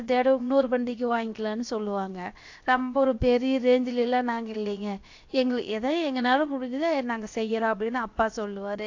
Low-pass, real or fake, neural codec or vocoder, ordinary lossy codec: 7.2 kHz; fake; codec, 16 kHz, 0.7 kbps, FocalCodec; AAC, 48 kbps